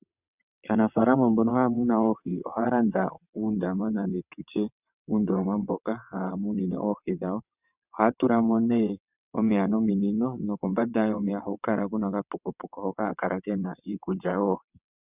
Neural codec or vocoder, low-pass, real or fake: vocoder, 22.05 kHz, 80 mel bands, WaveNeXt; 3.6 kHz; fake